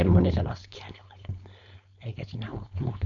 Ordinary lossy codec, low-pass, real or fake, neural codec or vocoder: none; 7.2 kHz; fake; codec, 16 kHz, 4.8 kbps, FACodec